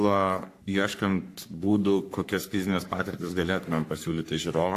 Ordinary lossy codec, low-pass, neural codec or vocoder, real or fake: AAC, 64 kbps; 14.4 kHz; codec, 44.1 kHz, 3.4 kbps, Pupu-Codec; fake